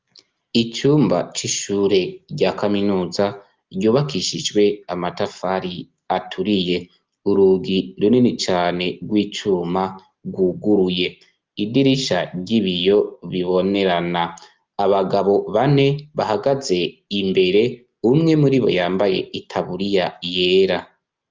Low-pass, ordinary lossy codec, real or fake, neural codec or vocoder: 7.2 kHz; Opus, 32 kbps; real; none